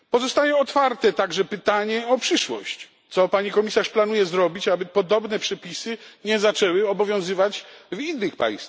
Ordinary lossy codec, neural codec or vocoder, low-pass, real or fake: none; none; none; real